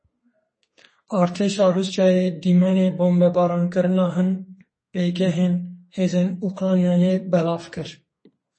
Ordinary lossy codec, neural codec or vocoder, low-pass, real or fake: MP3, 32 kbps; codec, 32 kHz, 1.9 kbps, SNAC; 9.9 kHz; fake